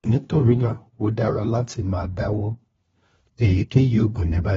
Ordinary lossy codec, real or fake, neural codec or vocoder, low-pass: AAC, 24 kbps; fake; codec, 16 kHz, 1 kbps, FunCodec, trained on LibriTTS, 50 frames a second; 7.2 kHz